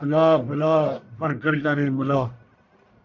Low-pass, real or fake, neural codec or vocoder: 7.2 kHz; fake; codec, 44.1 kHz, 1.7 kbps, Pupu-Codec